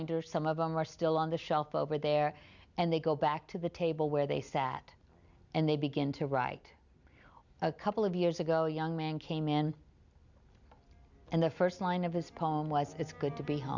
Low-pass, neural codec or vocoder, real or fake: 7.2 kHz; none; real